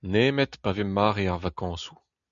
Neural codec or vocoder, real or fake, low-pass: none; real; 7.2 kHz